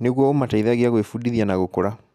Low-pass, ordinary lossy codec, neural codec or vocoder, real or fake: 14.4 kHz; none; none; real